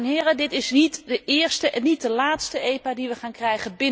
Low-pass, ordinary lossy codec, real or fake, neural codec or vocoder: none; none; real; none